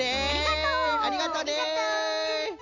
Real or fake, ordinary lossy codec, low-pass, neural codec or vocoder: real; none; 7.2 kHz; none